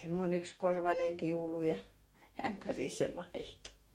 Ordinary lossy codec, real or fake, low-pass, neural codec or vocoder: MP3, 64 kbps; fake; 19.8 kHz; codec, 44.1 kHz, 2.6 kbps, DAC